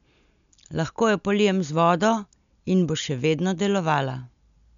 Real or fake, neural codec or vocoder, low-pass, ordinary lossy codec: real; none; 7.2 kHz; none